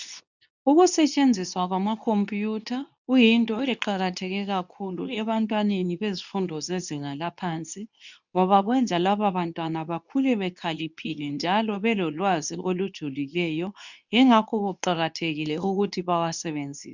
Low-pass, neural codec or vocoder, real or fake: 7.2 kHz; codec, 24 kHz, 0.9 kbps, WavTokenizer, medium speech release version 2; fake